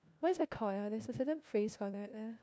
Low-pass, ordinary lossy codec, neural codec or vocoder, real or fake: none; none; codec, 16 kHz, 1 kbps, FunCodec, trained on LibriTTS, 50 frames a second; fake